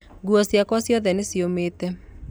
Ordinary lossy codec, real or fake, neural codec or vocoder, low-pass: none; real; none; none